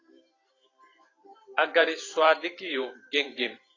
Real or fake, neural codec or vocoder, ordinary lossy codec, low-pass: fake; vocoder, 44.1 kHz, 128 mel bands every 512 samples, BigVGAN v2; AAC, 32 kbps; 7.2 kHz